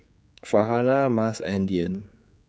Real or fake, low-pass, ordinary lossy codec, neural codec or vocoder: fake; none; none; codec, 16 kHz, 4 kbps, X-Codec, HuBERT features, trained on general audio